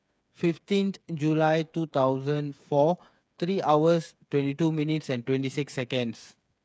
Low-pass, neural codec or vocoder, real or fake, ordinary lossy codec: none; codec, 16 kHz, 8 kbps, FreqCodec, smaller model; fake; none